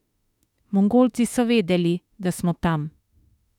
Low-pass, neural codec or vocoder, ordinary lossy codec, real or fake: 19.8 kHz; autoencoder, 48 kHz, 32 numbers a frame, DAC-VAE, trained on Japanese speech; none; fake